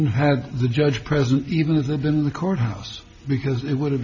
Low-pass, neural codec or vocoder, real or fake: 7.2 kHz; none; real